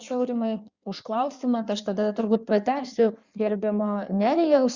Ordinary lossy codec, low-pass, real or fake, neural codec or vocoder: Opus, 64 kbps; 7.2 kHz; fake; codec, 16 kHz in and 24 kHz out, 1.1 kbps, FireRedTTS-2 codec